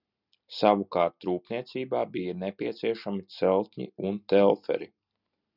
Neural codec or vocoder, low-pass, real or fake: none; 5.4 kHz; real